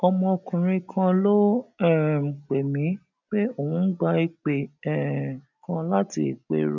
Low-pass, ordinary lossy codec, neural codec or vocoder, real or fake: 7.2 kHz; none; none; real